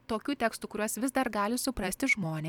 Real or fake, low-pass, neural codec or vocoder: fake; 19.8 kHz; vocoder, 44.1 kHz, 128 mel bands every 256 samples, BigVGAN v2